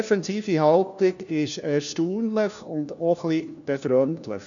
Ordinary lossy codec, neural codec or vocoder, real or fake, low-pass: none; codec, 16 kHz, 1 kbps, FunCodec, trained on LibriTTS, 50 frames a second; fake; 7.2 kHz